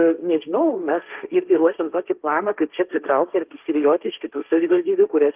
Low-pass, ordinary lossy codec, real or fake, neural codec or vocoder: 3.6 kHz; Opus, 32 kbps; fake; codec, 16 kHz, 1.1 kbps, Voila-Tokenizer